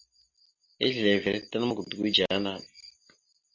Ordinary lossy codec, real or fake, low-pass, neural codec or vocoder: MP3, 64 kbps; real; 7.2 kHz; none